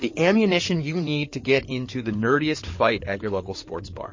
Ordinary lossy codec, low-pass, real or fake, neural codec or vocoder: MP3, 32 kbps; 7.2 kHz; fake; codec, 16 kHz in and 24 kHz out, 2.2 kbps, FireRedTTS-2 codec